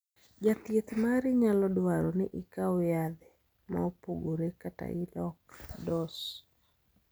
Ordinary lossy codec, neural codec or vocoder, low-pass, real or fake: none; none; none; real